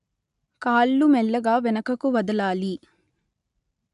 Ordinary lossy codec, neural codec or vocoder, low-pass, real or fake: none; none; 10.8 kHz; real